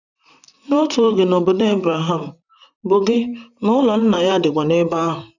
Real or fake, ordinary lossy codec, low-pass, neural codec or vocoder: fake; none; 7.2 kHz; vocoder, 44.1 kHz, 128 mel bands, Pupu-Vocoder